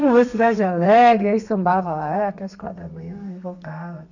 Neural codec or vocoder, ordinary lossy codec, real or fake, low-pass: codec, 32 kHz, 1.9 kbps, SNAC; none; fake; 7.2 kHz